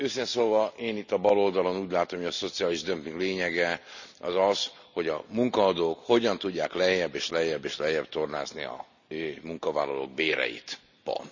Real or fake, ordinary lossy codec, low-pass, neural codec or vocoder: real; none; 7.2 kHz; none